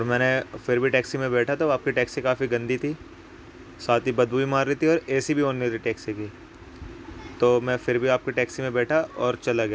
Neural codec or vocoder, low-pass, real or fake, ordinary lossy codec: none; none; real; none